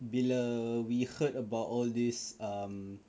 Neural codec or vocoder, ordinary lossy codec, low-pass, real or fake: none; none; none; real